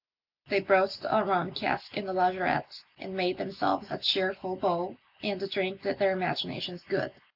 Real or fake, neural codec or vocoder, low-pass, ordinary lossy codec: real; none; 5.4 kHz; AAC, 48 kbps